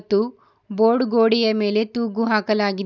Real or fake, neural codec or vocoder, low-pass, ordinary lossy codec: real; none; 7.2 kHz; none